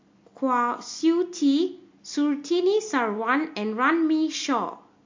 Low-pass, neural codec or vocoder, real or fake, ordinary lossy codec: 7.2 kHz; none; real; MP3, 48 kbps